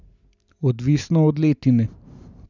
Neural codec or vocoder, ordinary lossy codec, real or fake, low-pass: none; none; real; 7.2 kHz